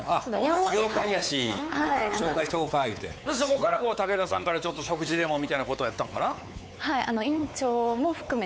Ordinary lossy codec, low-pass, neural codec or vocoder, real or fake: none; none; codec, 16 kHz, 4 kbps, X-Codec, WavLM features, trained on Multilingual LibriSpeech; fake